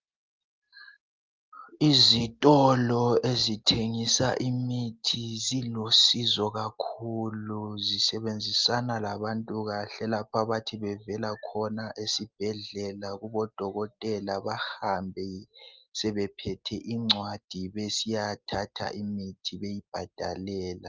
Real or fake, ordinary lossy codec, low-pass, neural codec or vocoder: real; Opus, 24 kbps; 7.2 kHz; none